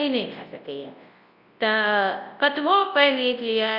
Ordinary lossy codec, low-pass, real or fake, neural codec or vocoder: Opus, 64 kbps; 5.4 kHz; fake; codec, 24 kHz, 0.9 kbps, WavTokenizer, large speech release